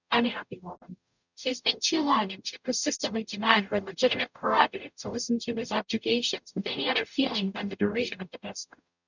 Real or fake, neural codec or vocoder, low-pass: fake; codec, 44.1 kHz, 0.9 kbps, DAC; 7.2 kHz